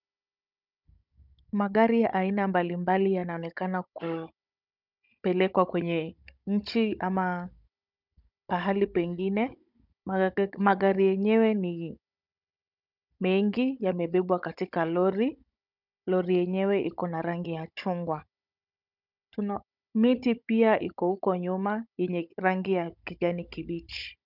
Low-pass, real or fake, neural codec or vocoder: 5.4 kHz; fake; codec, 16 kHz, 16 kbps, FunCodec, trained on Chinese and English, 50 frames a second